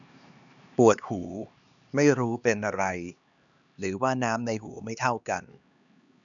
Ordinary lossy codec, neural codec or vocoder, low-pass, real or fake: none; codec, 16 kHz, 2 kbps, X-Codec, HuBERT features, trained on LibriSpeech; 7.2 kHz; fake